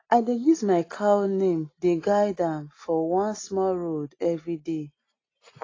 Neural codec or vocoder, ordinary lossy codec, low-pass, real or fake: none; AAC, 32 kbps; 7.2 kHz; real